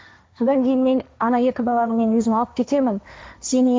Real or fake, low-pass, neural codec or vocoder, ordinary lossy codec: fake; none; codec, 16 kHz, 1.1 kbps, Voila-Tokenizer; none